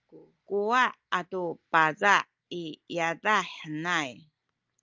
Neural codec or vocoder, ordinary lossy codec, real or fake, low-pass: none; Opus, 24 kbps; real; 7.2 kHz